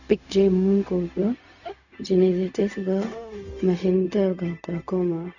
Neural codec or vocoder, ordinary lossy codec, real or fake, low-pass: codec, 16 kHz, 0.4 kbps, LongCat-Audio-Codec; none; fake; 7.2 kHz